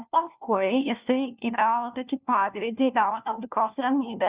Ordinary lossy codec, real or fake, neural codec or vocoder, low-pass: Opus, 64 kbps; fake; codec, 16 kHz, 1 kbps, FunCodec, trained on LibriTTS, 50 frames a second; 3.6 kHz